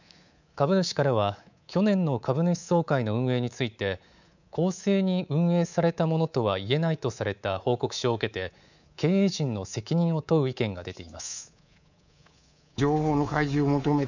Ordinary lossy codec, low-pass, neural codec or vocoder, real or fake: none; 7.2 kHz; codec, 24 kHz, 3.1 kbps, DualCodec; fake